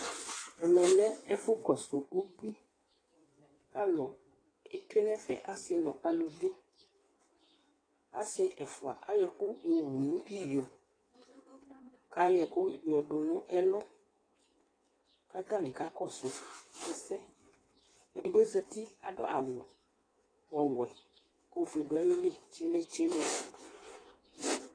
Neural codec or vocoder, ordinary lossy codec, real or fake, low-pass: codec, 16 kHz in and 24 kHz out, 1.1 kbps, FireRedTTS-2 codec; AAC, 32 kbps; fake; 9.9 kHz